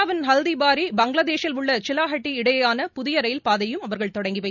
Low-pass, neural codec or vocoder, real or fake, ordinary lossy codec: 7.2 kHz; none; real; none